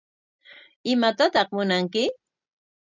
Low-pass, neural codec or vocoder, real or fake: 7.2 kHz; none; real